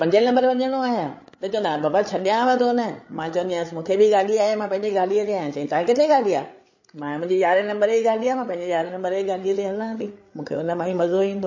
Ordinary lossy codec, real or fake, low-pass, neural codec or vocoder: MP3, 32 kbps; fake; 7.2 kHz; codec, 16 kHz, 8 kbps, FreqCodec, larger model